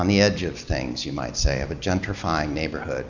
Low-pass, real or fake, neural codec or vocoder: 7.2 kHz; real; none